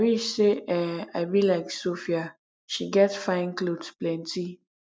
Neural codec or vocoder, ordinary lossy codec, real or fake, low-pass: none; none; real; none